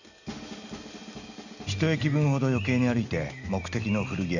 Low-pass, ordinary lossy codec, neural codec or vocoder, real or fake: 7.2 kHz; none; none; real